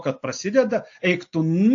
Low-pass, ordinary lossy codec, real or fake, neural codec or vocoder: 7.2 kHz; AAC, 48 kbps; real; none